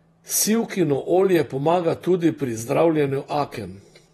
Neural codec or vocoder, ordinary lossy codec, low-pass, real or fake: vocoder, 48 kHz, 128 mel bands, Vocos; AAC, 32 kbps; 19.8 kHz; fake